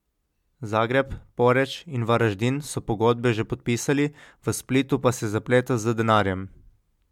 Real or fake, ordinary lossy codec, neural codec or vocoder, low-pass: real; MP3, 96 kbps; none; 19.8 kHz